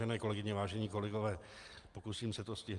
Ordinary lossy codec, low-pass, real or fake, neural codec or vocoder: Opus, 32 kbps; 10.8 kHz; real; none